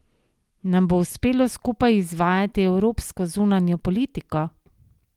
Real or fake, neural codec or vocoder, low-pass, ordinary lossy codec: real; none; 19.8 kHz; Opus, 24 kbps